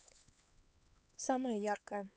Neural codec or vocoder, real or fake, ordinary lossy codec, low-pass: codec, 16 kHz, 4 kbps, X-Codec, HuBERT features, trained on LibriSpeech; fake; none; none